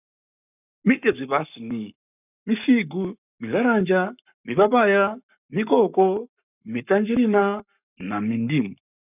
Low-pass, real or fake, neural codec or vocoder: 3.6 kHz; fake; codec, 44.1 kHz, 7.8 kbps, Pupu-Codec